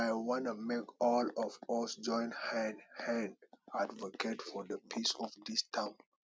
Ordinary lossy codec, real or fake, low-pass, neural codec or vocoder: none; real; none; none